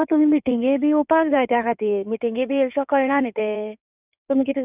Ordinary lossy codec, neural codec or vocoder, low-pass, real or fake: none; codec, 16 kHz in and 24 kHz out, 2.2 kbps, FireRedTTS-2 codec; 3.6 kHz; fake